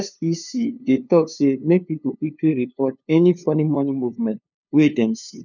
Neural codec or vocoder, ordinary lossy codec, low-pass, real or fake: codec, 16 kHz, 2 kbps, FunCodec, trained on LibriTTS, 25 frames a second; none; 7.2 kHz; fake